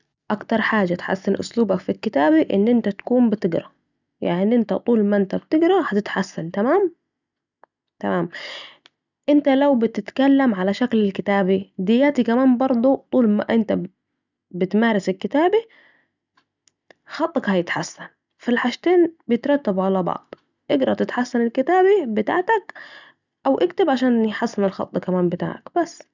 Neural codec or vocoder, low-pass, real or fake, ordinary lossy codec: none; 7.2 kHz; real; none